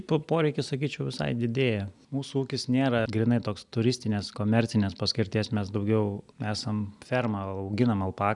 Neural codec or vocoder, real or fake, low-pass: none; real; 10.8 kHz